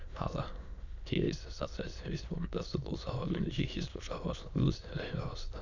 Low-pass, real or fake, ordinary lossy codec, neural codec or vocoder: 7.2 kHz; fake; none; autoencoder, 22.05 kHz, a latent of 192 numbers a frame, VITS, trained on many speakers